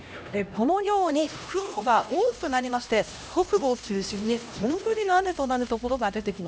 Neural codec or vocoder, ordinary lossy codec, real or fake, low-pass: codec, 16 kHz, 1 kbps, X-Codec, HuBERT features, trained on LibriSpeech; none; fake; none